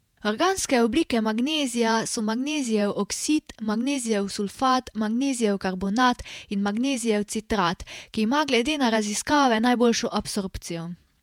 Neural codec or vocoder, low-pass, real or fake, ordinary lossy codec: vocoder, 48 kHz, 128 mel bands, Vocos; 19.8 kHz; fake; MP3, 96 kbps